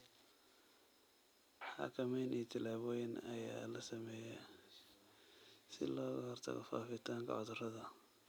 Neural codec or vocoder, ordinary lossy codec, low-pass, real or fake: none; none; none; real